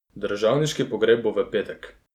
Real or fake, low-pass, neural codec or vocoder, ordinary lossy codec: real; 19.8 kHz; none; none